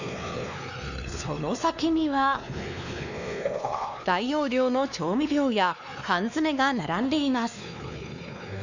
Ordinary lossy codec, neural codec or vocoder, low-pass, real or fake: none; codec, 16 kHz, 2 kbps, X-Codec, WavLM features, trained on Multilingual LibriSpeech; 7.2 kHz; fake